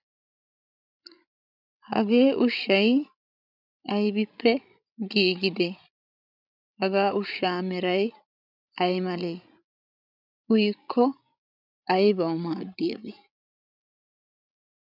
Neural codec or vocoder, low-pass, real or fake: codec, 16 kHz, 16 kbps, FreqCodec, larger model; 5.4 kHz; fake